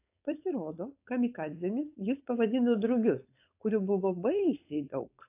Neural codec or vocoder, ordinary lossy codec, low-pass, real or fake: codec, 16 kHz, 4.8 kbps, FACodec; AAC, 32 kbps; 3.6 kHz; fake